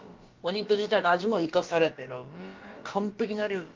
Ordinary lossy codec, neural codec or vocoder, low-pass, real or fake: Opus, 24 kbps; codec, 16 kHz, about 1 kbps, DyCAST, with the encoder's durations; 7.2 kHz; fake